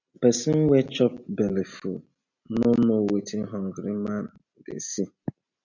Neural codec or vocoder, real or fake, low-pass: none; real; 7.2 kHz